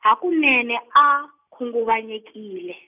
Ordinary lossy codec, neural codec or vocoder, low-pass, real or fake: none; none; 3.6 kHz; real